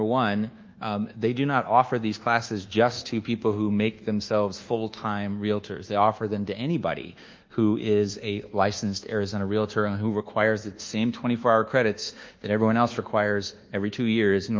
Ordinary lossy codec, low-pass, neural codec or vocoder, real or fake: Opus, 24 kbps; 7.2 kHz; codec, 24 kHz, 1.2 kbps, DualCodec; fake